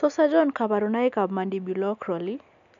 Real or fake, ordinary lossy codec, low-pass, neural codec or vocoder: real; none; 7.2 kHz; none